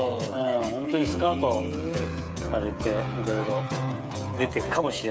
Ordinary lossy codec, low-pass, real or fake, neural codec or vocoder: none; none; fake; codec, 16 kHz, 8 kbps, FreqCodec, smaller model